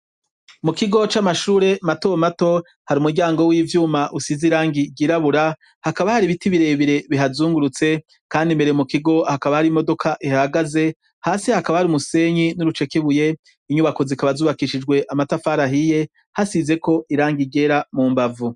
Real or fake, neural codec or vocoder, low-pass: real; none; 10.8 kHz